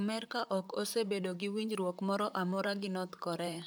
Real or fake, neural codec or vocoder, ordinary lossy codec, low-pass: fake; vocoder, 44.1 kHz, 128 mel bands, Pupu-Vocoder; none; none